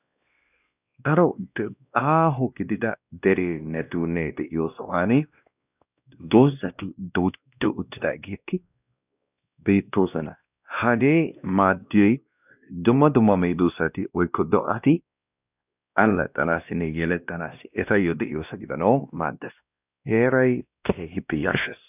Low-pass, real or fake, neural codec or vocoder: 3.6 kHz; fake; codec, 16 kHz, 1 kbps, X-Codec, WavLM features, trained on Multilingual LibriSpeech